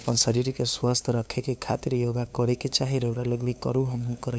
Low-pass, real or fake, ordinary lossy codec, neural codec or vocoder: none; fake; none; codec, 16 kHz, 2 kbps, FunCodec, trained on LibriTTS, 25 frames a second